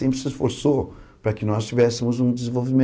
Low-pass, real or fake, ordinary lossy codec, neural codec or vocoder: none; real; none; none